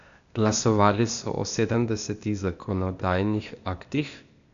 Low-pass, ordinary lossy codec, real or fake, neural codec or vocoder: 7.2 kHz; none; fake; codec, 16 kHz, 0.8 kbps, ZipCodec